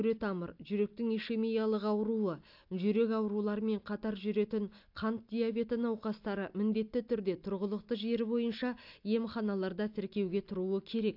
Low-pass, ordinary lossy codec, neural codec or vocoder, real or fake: 5.4 kHz; AAC, 48 kbps; none; real